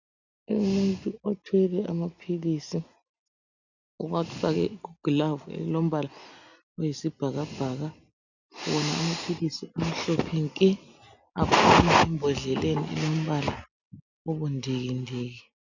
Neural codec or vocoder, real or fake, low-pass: none; real; 7.2 kHz